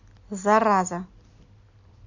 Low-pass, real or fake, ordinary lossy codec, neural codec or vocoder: 7.2 kHz; real; none; none